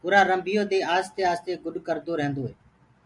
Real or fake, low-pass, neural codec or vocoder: real; 10.8 kHz; none